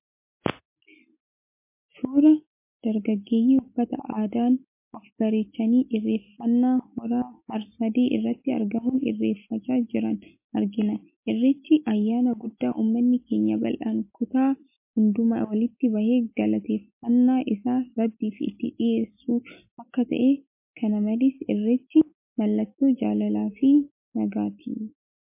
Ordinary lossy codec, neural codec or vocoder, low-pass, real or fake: MP3, 24 kbps; none; 3.6 kHz; real